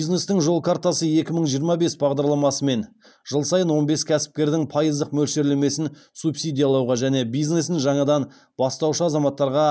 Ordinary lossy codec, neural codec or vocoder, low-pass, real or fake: none; none; none; real